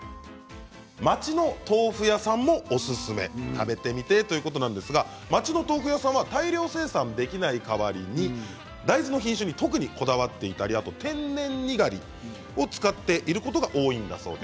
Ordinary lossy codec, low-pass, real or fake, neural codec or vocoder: none; none; real; none